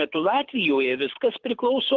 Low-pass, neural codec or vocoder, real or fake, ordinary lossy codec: 7.2 kHz; codec, 24 kHz, 6 kbps, HILCodec; fake; Opus, 16 kbps